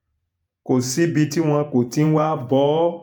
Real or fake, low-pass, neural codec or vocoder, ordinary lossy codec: fake; none; vocoder, 48 kHz, 128 mel bands, Vocos; none